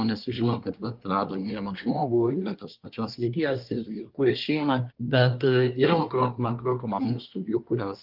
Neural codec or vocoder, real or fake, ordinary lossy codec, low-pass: codec, 24 kHz, 1 kbps, SNAC; fake; Opus, 32 kbps; 5.4 kHz